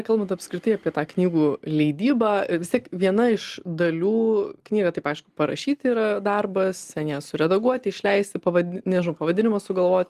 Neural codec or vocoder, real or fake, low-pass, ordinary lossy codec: none; real; 14.4 kHz; Opus, 24 kbps